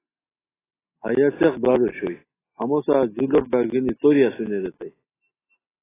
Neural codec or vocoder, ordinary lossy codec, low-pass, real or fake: none; AAC, 16 kbps; 3.6 kHz; real